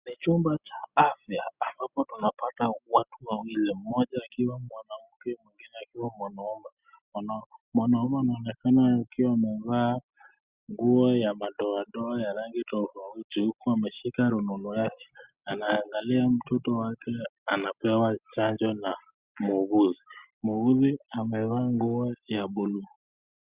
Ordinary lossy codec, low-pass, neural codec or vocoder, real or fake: Opus, 64 kbps; 3.6 kHz; none; real